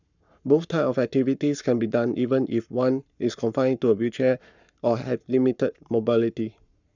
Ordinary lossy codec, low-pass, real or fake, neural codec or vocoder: none; 7.2 kHz; fake; codec, 44.1 kHz, 7.8 kbps, Pupu-Codec